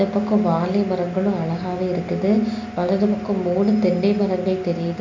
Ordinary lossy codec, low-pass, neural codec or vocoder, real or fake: MP3, 48 kbps; 7.2 kHz; none; real